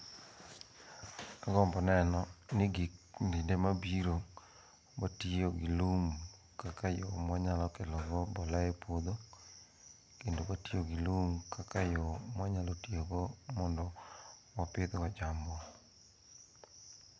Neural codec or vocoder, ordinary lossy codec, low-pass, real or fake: none; none; none; real